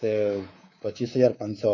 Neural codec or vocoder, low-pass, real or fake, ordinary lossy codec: codec, 24 kHz, 3.1 kbps, DualCodec; 7.2 kHz; fake; MP3, 64 kbps